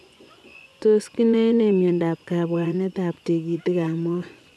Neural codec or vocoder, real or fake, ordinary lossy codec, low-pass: vocoder, 24 kHz, 100 mel bands, Vocos; fake; none; none